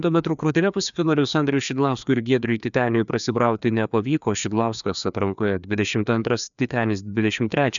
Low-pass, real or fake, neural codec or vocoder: 7.2 kHz; fake; codec, 16 kHz, 2 kbps, FreqCodec, larger model